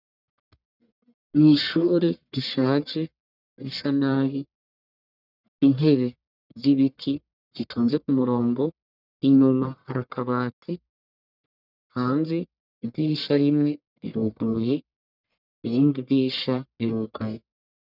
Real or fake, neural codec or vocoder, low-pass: fake; codec, 44.1 kHz, 1.7 kbps, Pupu-Codec; 5.4 kHz